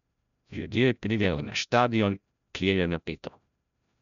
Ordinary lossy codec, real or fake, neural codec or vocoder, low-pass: none; fake; codec, 16 kHz, 0.5 kbps, FreqCodec, larger model; 7.2 kHz